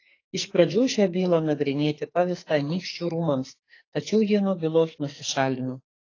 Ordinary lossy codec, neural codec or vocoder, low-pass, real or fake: AAC, 32 kbps; codec, 44.1 kHz, 2.6 kbps, SNAC; 7.2 kHz; fake